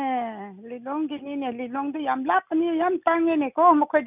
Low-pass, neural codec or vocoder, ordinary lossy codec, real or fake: 3.6 kHz; none; none; real